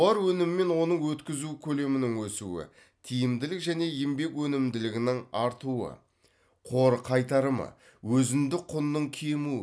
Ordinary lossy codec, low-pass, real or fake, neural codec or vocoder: none; none; real; none